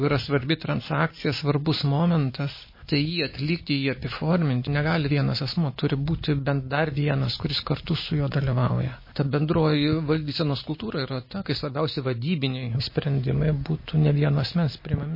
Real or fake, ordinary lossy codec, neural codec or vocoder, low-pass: real; MP3, 24 kbps; none; 5.4 kHz